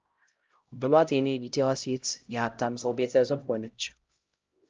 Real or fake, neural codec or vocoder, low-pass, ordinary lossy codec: fake; codec, 16 kHz, 0.5 kbps, X-Codec, HuBERT features, trained on LibriSpeech; 7.2 kHz; Opus, 24 kbps